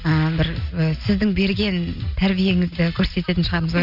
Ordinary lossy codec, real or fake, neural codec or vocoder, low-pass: none; real; none; 5.4 kHz